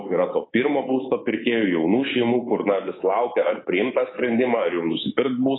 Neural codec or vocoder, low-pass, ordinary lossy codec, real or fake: codec, 24 kHz, 3.1 kbps, DualCodec; 7.2 kHz; AAC, 16 kbps; fake